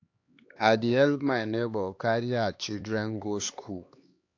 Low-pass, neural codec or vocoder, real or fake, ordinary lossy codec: 7.2 kHz; codec, 16 kHz, 2 kbps, X-Codec, HuBERT features, trained on LibriSpeech; fake; AAC, 48 kbps